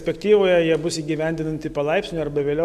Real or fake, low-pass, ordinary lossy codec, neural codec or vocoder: real; 14.4 kHz; AAC, 96 kbps; none